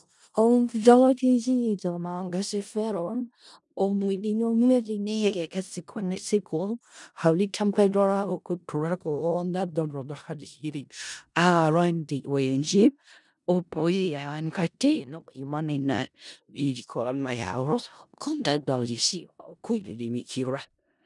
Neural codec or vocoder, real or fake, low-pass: codec, 16 kHz in and 24 kHz out, 0.4 kbps, LongCat-Audio-Codec, four codebook decoder; fake; 10.8 kHz